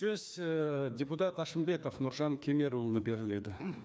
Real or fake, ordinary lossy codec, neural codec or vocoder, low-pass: fake; none; codec, 16 kHz, 2 kbps, FreqCodec, larger model; none